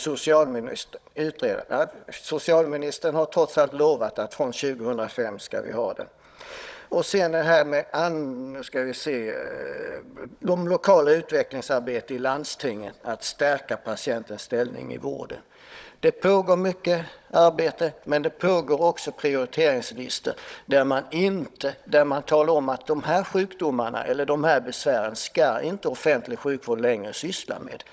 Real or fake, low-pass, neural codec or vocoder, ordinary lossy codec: fake; none; codec, 16 kHz, 16 kbps, FunCodec, trained on Chinese and English, 50 frames a second; none